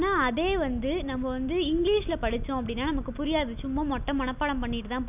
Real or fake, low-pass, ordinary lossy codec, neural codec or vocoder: real; 3.6 kHz; none; none